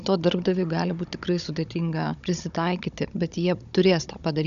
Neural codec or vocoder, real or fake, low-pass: codec, 16 kHz, 16 kbps, FunCodec, trained on Chinese and English, 50 frames a second; fake; 7.2 kHz